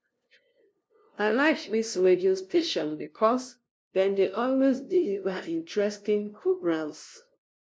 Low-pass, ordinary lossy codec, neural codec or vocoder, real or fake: none; none; codec, 16 kHz, 0.5 kbps, FunCodec, trained on LibriTTS, 25 frames a second; fake